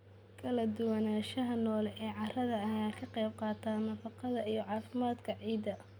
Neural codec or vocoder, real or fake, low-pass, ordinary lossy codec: none; real; none; none